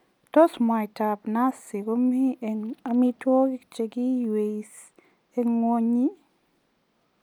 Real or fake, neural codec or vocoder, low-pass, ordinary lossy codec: real; none; 19.8 kHz; none